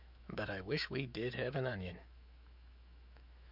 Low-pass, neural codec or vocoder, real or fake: 5.4 kHz; autoencoder, 48 kHz, 128 numbers a frame, DAC-VAE, trained on Japanese speech; fake